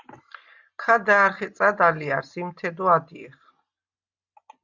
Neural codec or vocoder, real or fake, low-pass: none; real; 7.2 kHz